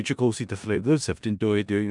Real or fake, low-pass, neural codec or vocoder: fake; 10.8 kHz; codec, 16 kHz in and 24 kHz out, 0.4 kbps, LongCat-Audio-Codec, four codebook decoder